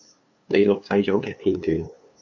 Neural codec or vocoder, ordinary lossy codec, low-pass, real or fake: codec, 16 kHz, 2 kbps, FunCodec, trained on LibriTTS, 25 frames a second; MP3, 48 kbps; 7.2 kHz; fake